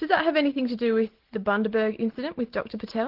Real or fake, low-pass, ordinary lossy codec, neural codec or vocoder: real; 5.4 kHz; Opus, 16 kbps; none